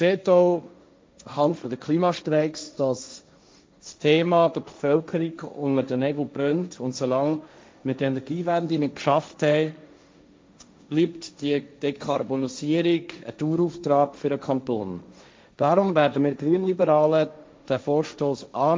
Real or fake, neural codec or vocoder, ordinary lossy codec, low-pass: fake; codec, 16 kHz, 1.1 kbps, Voila-Tokenizer; none; none